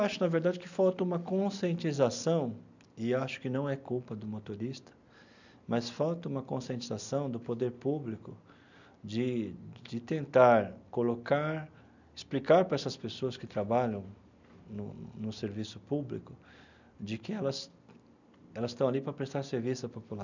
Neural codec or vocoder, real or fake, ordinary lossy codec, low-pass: none; real; none; 7.2 kHz